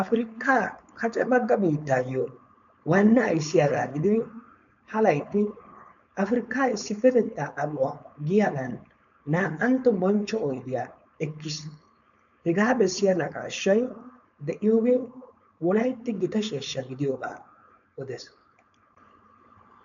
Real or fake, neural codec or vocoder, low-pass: fake; codec, 16 kHz, 4.8 kbps, FACodec; 7.2 kHz